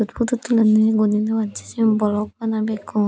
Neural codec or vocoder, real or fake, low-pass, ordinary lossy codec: none; real; none; none